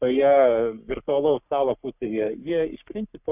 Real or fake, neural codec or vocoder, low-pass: fake; codec, 44.1 kHz, 3.4 kbps, Pupu-Codec; 3.6 kHz